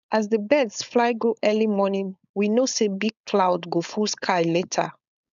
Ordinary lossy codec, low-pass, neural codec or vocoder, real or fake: none; 7.2 kHz; codec, 16 kHz, 4.8 kbps, FACodec; fake